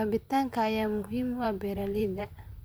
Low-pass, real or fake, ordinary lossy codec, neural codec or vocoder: none; fake; none; vocoder, 44.1 kHz, 128 mel bands, Pupu-Vocoder